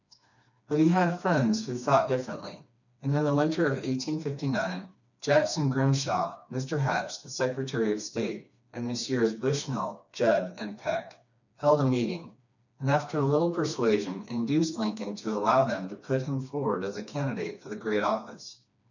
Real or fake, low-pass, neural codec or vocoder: fake; 7.2 kHz; codec, 16 kHz, 2 kbps, FreqCodec, smaller model